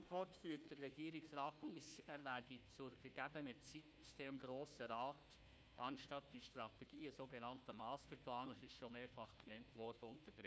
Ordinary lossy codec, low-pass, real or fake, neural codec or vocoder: none; none; fake; codec, 16 kHz, 1 kbps, FunCodec, trained on Chinese and English, 50 frames a second